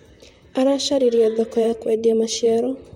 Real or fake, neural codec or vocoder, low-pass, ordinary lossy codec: fake; vocoder, 44.1 kHz, 128 mel bands, Pupu-Vocoder; 19.8 kHz; MP3, 64 kbps